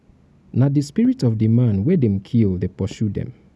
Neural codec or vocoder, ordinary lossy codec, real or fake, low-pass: none; none; real; none